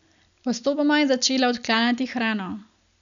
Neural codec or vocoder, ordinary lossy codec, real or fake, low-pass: none; none; real; 7.2 kHz